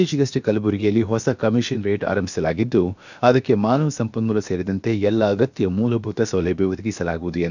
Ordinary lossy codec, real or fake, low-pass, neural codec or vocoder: none; fake; 7.2 kHz; codec, 16 kHz, about 1 kbps, DyCAST, with the encoder's durations